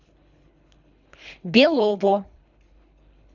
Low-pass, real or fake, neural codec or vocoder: 7.2 kHz; fake; codec, 24 kHz, 3 kbps, HILCodec